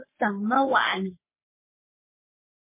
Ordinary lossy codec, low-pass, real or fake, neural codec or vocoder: MP3, 24 kbps; 3.6 kHz; fake; codec, 44.1 kHz, 3.4 kbps, Pupu-Codec